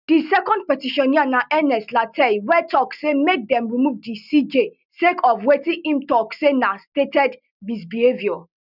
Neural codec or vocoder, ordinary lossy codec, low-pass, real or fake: none; none; 5.4 kHz; real